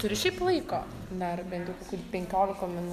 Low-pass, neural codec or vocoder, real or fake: 14.4 kHz; none; real